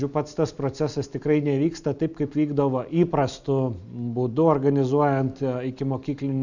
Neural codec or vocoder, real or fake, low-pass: none; real; 7.2 kHz